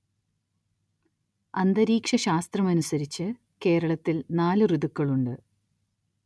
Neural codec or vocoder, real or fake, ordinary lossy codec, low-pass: none; real; none; none